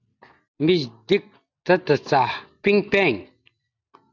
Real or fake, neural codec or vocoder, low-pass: real; none; 7.2 kHz